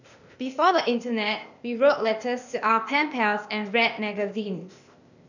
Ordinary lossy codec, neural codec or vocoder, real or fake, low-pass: none; codec, 16 kHz, 0.8 kbps, ZipCodec; fake; 7.2 kHz